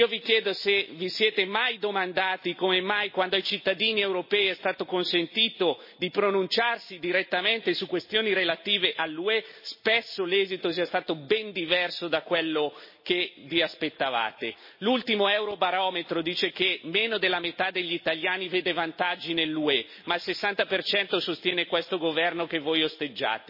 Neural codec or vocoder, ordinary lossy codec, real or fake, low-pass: none; MP3, 24 kbps; real; 5.4 kHz